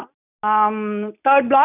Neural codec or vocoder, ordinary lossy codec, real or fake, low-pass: none; none; real; 3.6 kHz